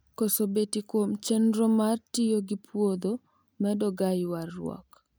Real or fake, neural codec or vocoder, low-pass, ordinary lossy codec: real; none; none; none